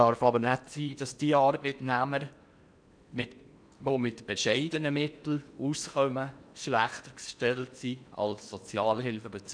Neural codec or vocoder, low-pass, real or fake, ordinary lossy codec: codec, 16 kHz in and 24 kHz out, 0.8 kbps, FocalCodec, streaming, 65536 codes; 9.9 kHz; fake; none